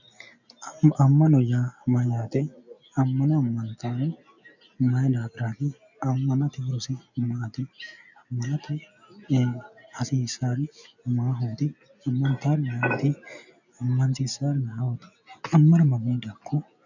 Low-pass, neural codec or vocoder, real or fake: 7.2 kHz; none; real